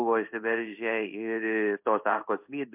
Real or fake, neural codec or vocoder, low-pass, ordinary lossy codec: fake; codec, 16 kHz in and 24 kHz out, 1 kbps, XY-Tokenizer; 3.6 kHz; MP3, 32 kbps